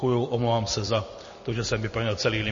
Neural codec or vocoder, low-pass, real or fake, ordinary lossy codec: none; 7.2 kHz; real; MP3, 32 kbps